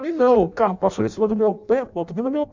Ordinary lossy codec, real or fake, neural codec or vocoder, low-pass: MP3, 64 kbps; fake; codec, 16 kHz in and 24 kHz out, 0.6 kbps, FireRedTTS-2 codec; 7.2 kHz